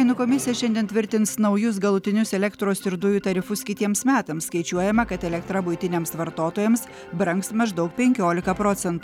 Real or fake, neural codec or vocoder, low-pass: real; none; 19.8 kHz